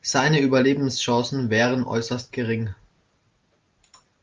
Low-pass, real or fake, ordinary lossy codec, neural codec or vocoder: 7.2 kHz; real; Opus, 32 kbps; none